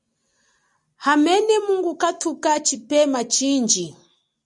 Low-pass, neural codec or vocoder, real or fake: 10.8 kHz; none; real